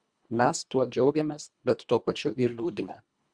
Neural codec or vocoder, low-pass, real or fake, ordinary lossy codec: codec, 24 kHz, 1.5 kbps, HILCodec; 9.9 kHz; fake; Opus, 64 kbps